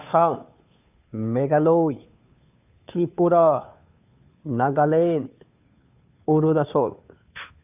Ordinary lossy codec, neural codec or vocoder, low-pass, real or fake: none; codec, 16 kHz, 2 kbps, FunCodec, trained on Chinese and English, 25 frames a second; 3.6 kHz; fake